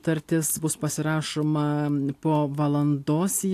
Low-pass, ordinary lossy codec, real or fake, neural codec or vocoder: 14.4 kHz; AAC, 64 kbps; real; none